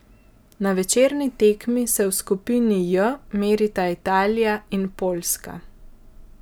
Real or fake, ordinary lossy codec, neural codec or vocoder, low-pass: real; none; none; none